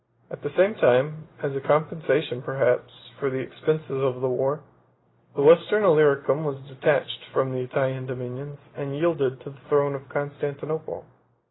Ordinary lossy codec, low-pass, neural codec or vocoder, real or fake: AAC, 16 kbps; 7.2 kHz; none; real